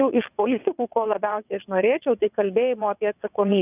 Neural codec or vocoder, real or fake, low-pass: vocoder, 22.05 kHz, 80 mel bands, WaveNeXt; fake; 3.6 kHz